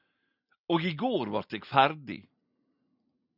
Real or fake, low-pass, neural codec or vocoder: real; 5.4 kHz; none